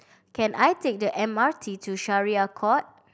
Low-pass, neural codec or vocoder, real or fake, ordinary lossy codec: none; none; real; none